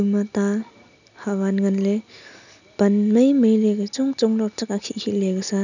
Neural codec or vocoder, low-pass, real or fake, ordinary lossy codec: none; 7.2 kHz; real; none